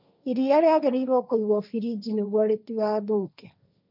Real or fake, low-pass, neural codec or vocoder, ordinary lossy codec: fake; 5.4 kHz; codec, 16 kHz, 1.1 kbps, Voila-Tokenizer; none